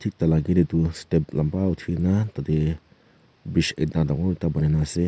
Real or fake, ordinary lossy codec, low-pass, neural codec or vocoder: real; none; none; none